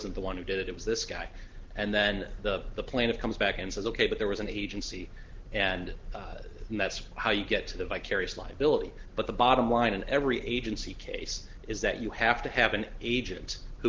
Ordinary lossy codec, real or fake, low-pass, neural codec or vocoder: Opus, 16 kbps; real; 7.2 kHz; none